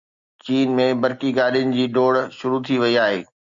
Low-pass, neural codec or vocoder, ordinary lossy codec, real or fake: 7.2 kHz; none; Opus, 64 kbps; real